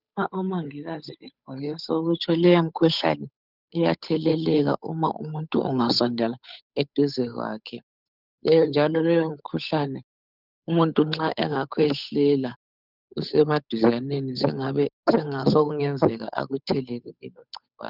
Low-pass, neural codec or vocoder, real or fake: 5.4 kHz; codec, 16 kHz, 8 kbps, FunCodec, trained on Chinese and English, 25 frames a second; fake